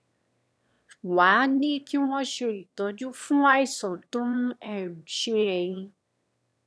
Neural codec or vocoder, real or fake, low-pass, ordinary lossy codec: autoencoder, 22.05 kHz, a latent of 192 numbers a frame, VITS, trained on one speaker; fake; none; none